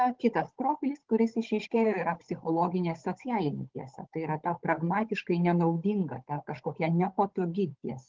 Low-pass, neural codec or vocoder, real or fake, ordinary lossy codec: 7.2 kHz; codec, 16 kHz, 16 kbps, FunCodec, trained on Chinese and English, 50 frames a second; fake; Opus, 16 kbps